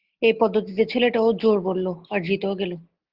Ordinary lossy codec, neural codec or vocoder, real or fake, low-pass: Opus, 16 kbps; none; real; 5.4 kHz